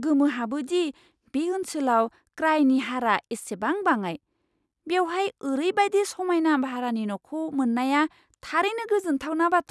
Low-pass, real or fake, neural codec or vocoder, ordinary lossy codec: none; real; none; none